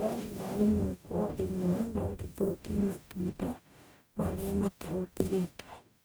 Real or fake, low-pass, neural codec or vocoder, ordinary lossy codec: fake; none; codec, 44.1 kHz, 0.9 kbps, DAC; none